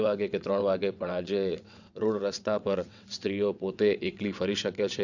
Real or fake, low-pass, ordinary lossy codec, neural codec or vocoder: fake; 7.2 kHz; none; vocoder, 22.05 kHz, 80 mel bands, WaveNeXt